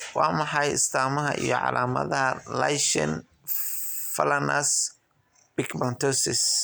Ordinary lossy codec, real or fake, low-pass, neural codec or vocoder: none; real; none; none